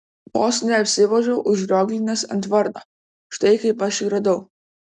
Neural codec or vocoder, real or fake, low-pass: none; real; 9.9 kHz